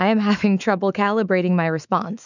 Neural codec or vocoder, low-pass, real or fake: autoencoder, 48 kHz, 128 numbers a frame, DAC-VAE, trained on Japanese speech; 7.2 kHz; fake